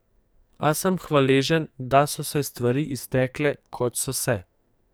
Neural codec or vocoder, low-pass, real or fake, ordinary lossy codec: codec, 44.1 kHz, 2.6 kbps, SNAC; none; fake; none